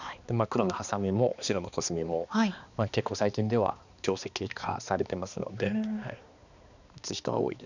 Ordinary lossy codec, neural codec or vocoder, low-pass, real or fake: none; codec, 16 kHz, 2 kbps, X-Codec, HuBERT features, trained on balanced general audio; 7.2 kHz; fake